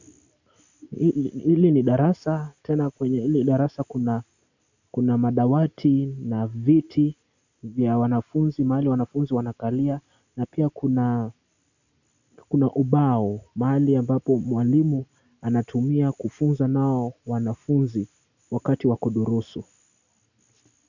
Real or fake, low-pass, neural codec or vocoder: real; 7.2 kHz; none